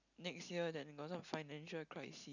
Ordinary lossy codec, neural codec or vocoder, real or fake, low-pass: none; none; real; 7.2 kHz